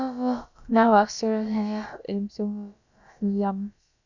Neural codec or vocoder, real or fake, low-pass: codec, 16 kHz, about 1 kbps, DyCAST, with the encoder's durations; fake; 7.2 kHz